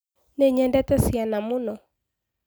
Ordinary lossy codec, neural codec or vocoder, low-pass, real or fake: none; none; none; real